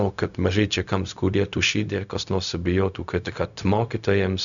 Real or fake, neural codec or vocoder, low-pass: fake; codec, 16 kHz, 0.4 kbps, LongCat-Audio-Codec; 7.2 kHz